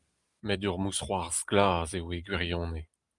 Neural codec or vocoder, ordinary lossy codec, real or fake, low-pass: none; Opus, 32 kbps; real; 10.8 kHz